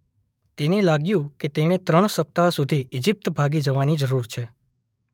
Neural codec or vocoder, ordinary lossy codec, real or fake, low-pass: codec, 44.1 kHz, 7.8 kbps, DAC; MP3, 96 kbps; fake; 19.8 kHz